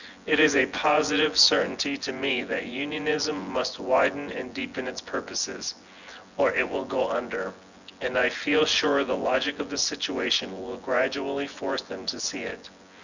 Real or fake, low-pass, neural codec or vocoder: fake; 7.2 kHz; vocoder, 24 kHz, 100 mel bands, Vocos